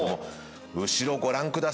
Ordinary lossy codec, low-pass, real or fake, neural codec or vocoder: none; none; real; none